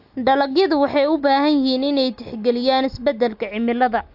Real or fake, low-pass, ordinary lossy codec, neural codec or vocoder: real; 5.4 kHz; AAC, 48 kbps; none